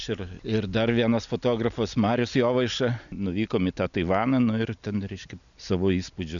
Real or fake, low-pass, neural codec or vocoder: real; 7.2 kHz; none